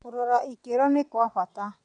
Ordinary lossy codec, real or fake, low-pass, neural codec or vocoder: none; fake; 9.9 kHz; vocoder, 22.05 kHz, 80 mel bands, WaveNeXt